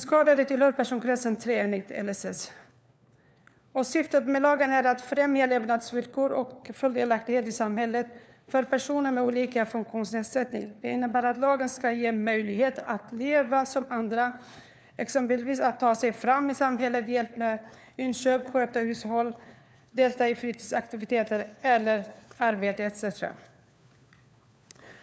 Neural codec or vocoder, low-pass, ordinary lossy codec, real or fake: codec, 16 kHz, 4 kbps, FunCodec, trained on LibriTTS, 50 frames a second; none; none; fake